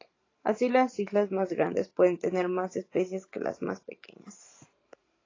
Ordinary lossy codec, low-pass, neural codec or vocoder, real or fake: AAC, 32 kbps; 7.2 kHz; vocoder, 44.1 kHz, 128 mel bands every 512 samples, BigVGAN v2; fake